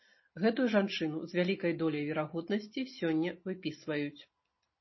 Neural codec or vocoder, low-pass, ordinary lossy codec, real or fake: none; 7.2 kHz; MP3, 24 kbps; real